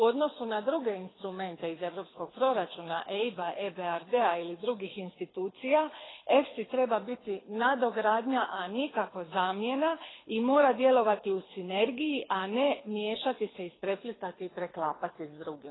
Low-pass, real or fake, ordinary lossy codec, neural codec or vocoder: 7.2 kHz; fake; AAC, 16 kbps; codec, 24 kHz, 6 kbps, HILCodec